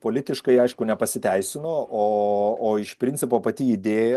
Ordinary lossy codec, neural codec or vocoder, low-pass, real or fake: Opus, 32 kbps; none; 14.4 kHz; real